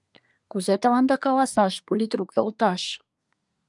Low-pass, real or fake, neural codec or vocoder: 10.8 kHz; fake; codec, 24 kHz, 1 kbps, SNAC